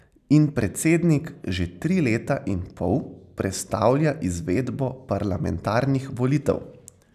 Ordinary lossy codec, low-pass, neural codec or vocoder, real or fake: none; 14.4 kHz; none; real